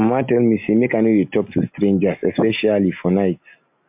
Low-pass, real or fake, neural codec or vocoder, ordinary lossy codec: 3.6 kHz; real; none; MP3, 32 kbps